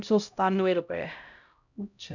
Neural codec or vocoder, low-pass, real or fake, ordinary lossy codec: codec, 16 kHz, 0.5 kbps, X-Codec, HuBERT features, trained on LibriSpeech; 7.2 kHz; fake; none